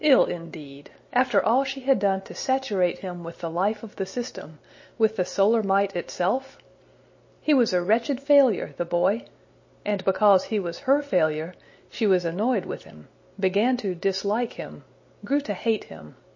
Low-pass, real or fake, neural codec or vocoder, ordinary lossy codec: 7.2 kHz; real; none; MP3, 32 kbps